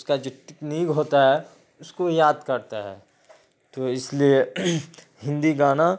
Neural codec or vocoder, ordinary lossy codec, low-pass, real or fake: none; none; none; real